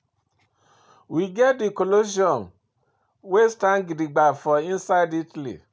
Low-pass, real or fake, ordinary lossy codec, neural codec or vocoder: none; real; none; none